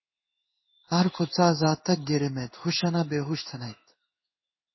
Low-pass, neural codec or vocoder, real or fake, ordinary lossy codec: 7.2 kHz; codec, 16 kHz in and 24 kHz out, 1 kbps, XY-Tokenizer; fake; MP3, 24 kbps